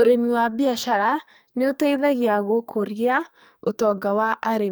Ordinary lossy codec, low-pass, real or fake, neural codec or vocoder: none; none; fake; codec, 44.1 kHz, 2.6 kbps, SNAC